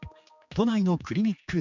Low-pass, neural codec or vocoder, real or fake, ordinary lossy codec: 7.2 kHz; codec, 16 kHz, 4 kbps, X-Codec, HuBERT features, trained on general audio; fake; none